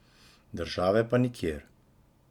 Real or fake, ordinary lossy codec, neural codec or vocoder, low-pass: real; Opus, 64 kbps; none; 19.8 kHz